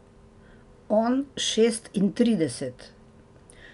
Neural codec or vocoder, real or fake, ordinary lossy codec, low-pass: none; real; none; 10.8 kHz